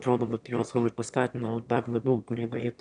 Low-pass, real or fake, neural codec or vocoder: 9.9 kHz; fake; autoencoder, 22.05 kHz, a latent of 192 numbers a frame, VITS, trained on one speaker